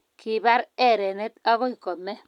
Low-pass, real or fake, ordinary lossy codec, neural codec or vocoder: 19.8 kHz; real; none; none